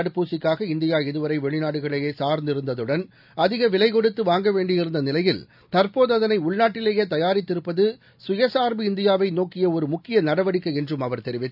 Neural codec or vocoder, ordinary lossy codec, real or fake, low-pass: none; none; real; 5.4 kHz